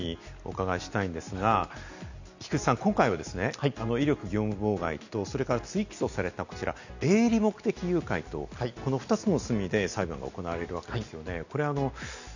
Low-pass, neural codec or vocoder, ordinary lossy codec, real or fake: 7.2 kHz; none; none; real